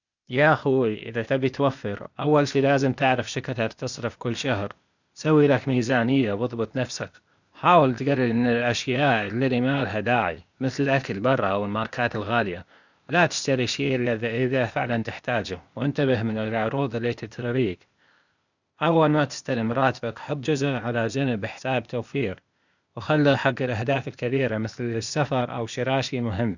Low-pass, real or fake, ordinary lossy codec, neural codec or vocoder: 7.2 kHz; fake; none; codec, 16 kHz, 0.8 kbps, ZipCodec